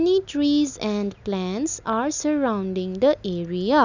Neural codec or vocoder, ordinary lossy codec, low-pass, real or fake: none; none; 7.2 kHz; real